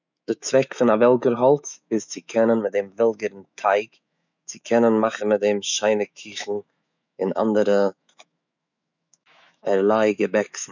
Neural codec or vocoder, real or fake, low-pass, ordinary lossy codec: autoencoder, 48 kHz, 128 numbers a frame, DAC-VAE, trained on Japanese speech; fake; 7.2 kHz; none